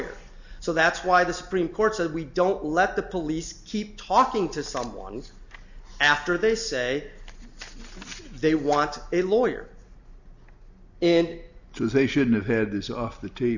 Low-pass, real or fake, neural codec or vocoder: 7.2 kHz; real; none